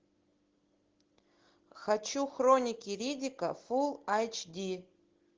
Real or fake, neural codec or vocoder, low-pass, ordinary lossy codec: real; none; 7.2 kHz; Opus, 16 kbps